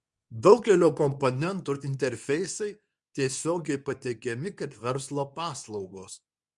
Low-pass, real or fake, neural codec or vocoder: 10.8 kHz; fake; codec, 24 kHz, 0.9 kbps, WavTokenizer, medium speech release version 2